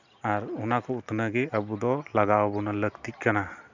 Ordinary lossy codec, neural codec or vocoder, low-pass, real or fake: none; none; 7.2 kHz; real